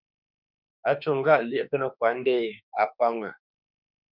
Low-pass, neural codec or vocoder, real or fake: 5.4 kHz; autoencoder, 48 kHz, 32 numbers a frame, DAC-VAE, trained on Japanese speech; fake